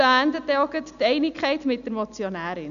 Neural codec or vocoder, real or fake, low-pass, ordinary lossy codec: none; real; 7.2 kHz; none